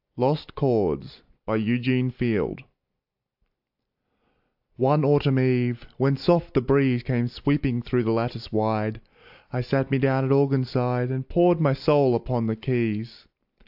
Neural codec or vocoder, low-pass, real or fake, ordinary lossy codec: none; 5.4 kHz; real; AAC, 48 kbps